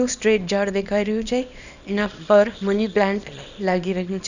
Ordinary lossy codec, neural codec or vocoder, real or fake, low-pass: none; codec, 24 kHz, 0.9 kbps, WavTokenizer, small release; fake; 7.2 kHz